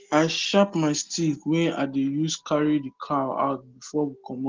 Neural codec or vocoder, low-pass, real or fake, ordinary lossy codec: none; 7.2 kHz; real; Opus, 16 kbps